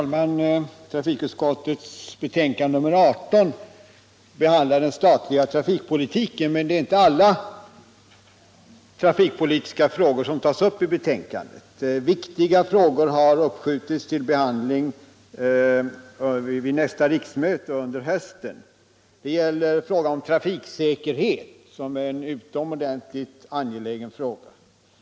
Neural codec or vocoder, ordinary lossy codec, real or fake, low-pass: none; none; real; none